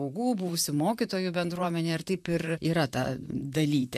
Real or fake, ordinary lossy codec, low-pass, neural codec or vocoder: fake; MP3, 96 kbps; 14.4 kHz; vocoder, 44.1 kHz, 128 mel bands, Pupu-Vocoder